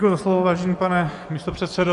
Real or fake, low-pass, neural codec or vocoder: fake; 10.8 kHz; vocoder, 24 kHz, 100 mel bands, Vocos